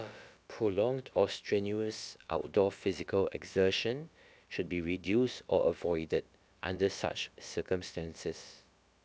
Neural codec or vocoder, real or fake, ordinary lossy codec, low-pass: codec, 16 kHz, about 1 kbps, DyCAST, with the encoder's durations; fake; none; none